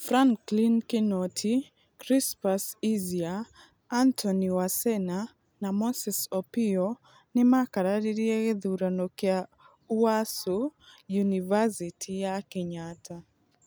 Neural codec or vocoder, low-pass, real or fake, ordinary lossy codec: none; none; real; none